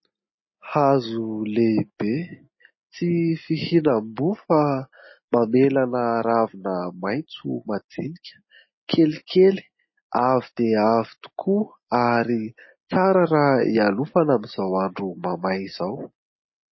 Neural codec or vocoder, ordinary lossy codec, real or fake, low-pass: none; MP3, 24 kbps; real; 7.2 kHz